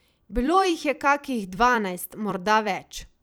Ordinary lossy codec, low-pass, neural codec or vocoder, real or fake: none; none; vocoder, 44.1 kHz, 128 mel bands every 256 samples, BigVGAN v2; fake